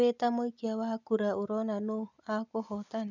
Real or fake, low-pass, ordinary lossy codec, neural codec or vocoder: real; 7.2 kHz; none; none